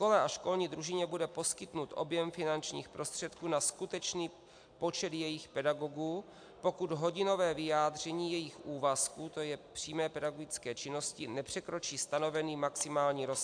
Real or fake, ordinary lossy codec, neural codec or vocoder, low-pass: real; AAC, 64 kbps; none; 9.9 kHz